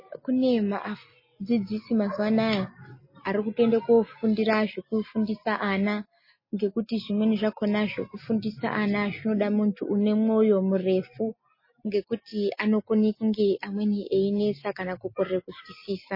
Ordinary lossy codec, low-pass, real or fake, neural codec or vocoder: MP3, 24 kbps; 5.4 kHz; real; none